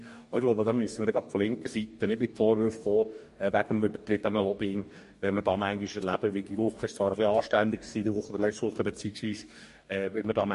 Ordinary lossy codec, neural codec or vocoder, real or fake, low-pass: MP3, 48 kbps; codec, 44.1 kHz, 2.6 kbps, DAC; fake; 14.4 kHz